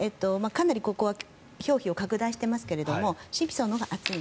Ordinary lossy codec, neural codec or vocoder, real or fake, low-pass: none; none; real; none